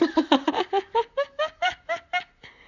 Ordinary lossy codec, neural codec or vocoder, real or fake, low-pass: none; vocoder, 44.1 kHz, 128 mel bands, Pupu-Vocoder; fake; 7.2 kHz